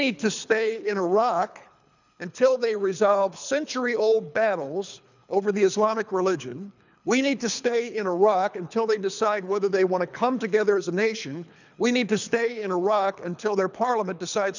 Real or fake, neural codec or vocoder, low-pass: fake; codec, 24 kHz, 3 kbps, HILCodec; 7.2 kHz